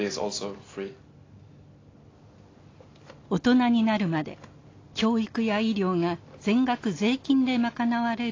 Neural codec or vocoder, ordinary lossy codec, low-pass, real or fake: none; AAC, 32 kbps; 7.2 kHz; real